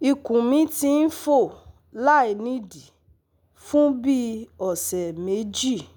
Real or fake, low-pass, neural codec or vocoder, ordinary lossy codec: real; none; none; none